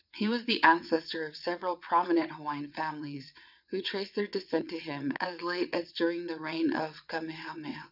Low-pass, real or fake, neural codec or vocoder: 5.4 kHz; fake; vocoder, 22.05 kHz, 80 mel bands, WaveNeXt